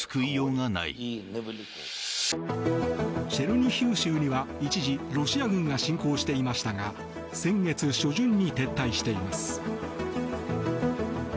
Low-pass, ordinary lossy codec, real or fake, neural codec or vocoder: none; none; real; none